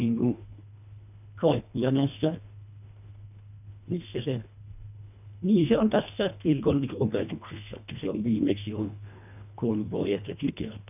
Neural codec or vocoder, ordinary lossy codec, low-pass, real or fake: codec, 24 kHz, 1.5 kbps, HILCodec; none; 3.6 kHz; fake